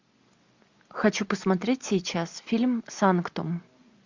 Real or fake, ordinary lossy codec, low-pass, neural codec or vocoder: real; MP3, 64 kbps; 7.2 kHz; none